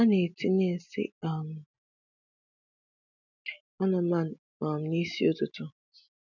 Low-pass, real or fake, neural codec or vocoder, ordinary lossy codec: 7.2 kHz; real; none; none